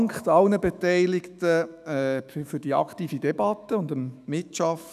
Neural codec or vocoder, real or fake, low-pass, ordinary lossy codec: autoencoder, 48 kHz, 128 numbers a frame, DAC-VAE, trained on Japanese speech; fake; 14.4 kHz; none